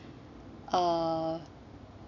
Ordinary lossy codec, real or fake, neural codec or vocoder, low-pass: none; real; none; 7.2 kHz